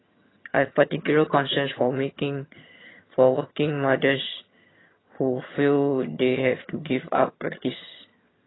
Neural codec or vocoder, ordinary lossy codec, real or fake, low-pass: vocoder, 22.05 kHz, 80 mel bands, HiFi-GAN; AAC, 16 kbps; fake; 7.2 kHz